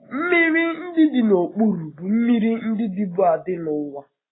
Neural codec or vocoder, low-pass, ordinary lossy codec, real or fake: none; 7.2 kHz; AAC, 16 kbps; real